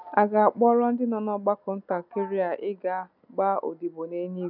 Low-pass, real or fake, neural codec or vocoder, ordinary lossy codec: 5.4 kHz; real; none; none